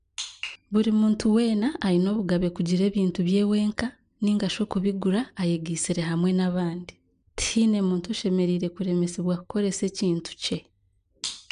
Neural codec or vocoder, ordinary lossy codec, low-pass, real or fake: none; MP3, 96 kbps; 9.9 kHz; real